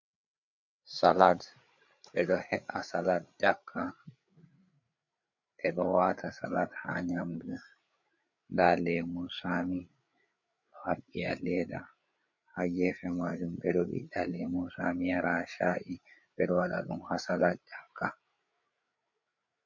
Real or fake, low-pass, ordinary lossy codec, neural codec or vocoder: fake; 7.2 kHz; MP3, 48 kbps; codec, 16 kHz, 8 kbps, FreqCodec, larger model